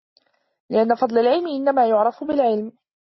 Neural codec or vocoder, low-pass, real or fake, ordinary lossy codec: none; 7.2 kHz; real; MP3, 24 kbps